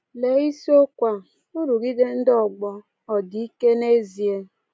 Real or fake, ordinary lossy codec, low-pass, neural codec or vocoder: real; none; none; none